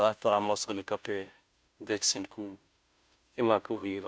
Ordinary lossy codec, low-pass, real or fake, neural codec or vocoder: none; none; fake; codec, 16 kHz, 0.5 kbps, FunCodec, trained on Chinese and English, 25 frames a second